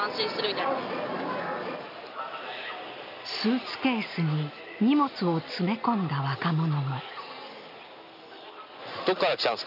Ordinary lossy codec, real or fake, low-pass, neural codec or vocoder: none; real; 5.4 kHz; none